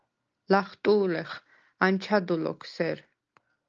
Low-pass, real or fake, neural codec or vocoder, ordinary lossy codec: 7.2 kHz; real; none; Opus, 24 kbps